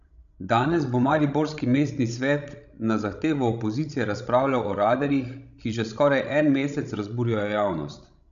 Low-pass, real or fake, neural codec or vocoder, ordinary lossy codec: 7.2 kHz; fake; codec, 16 kHz, 16 kbps, FreqCodec, larger model; none